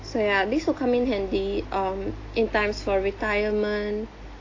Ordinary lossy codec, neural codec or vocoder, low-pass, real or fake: AAC, 32 kbps; none; 7.2 kHz; real